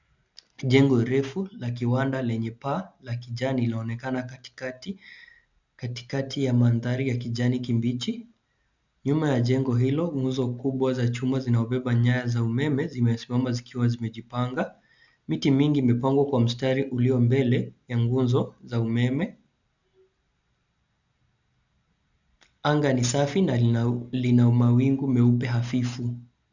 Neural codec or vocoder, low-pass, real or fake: none; 7.2 kHz; real